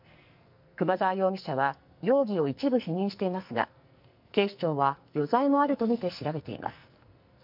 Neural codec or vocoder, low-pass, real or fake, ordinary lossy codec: codec, 44.1 kHz, 2.6 kbps, SNAC; 5.4 kHz; fake; MP3, 48 kbps